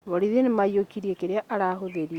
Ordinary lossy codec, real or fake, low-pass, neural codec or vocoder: Opus, 64 kbps; real; 19.8 kHz; none